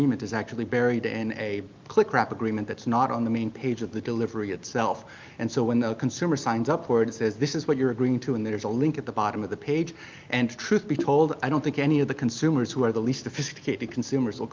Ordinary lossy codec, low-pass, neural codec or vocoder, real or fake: Opus, 32 kbps; 7.2 kHz; none; real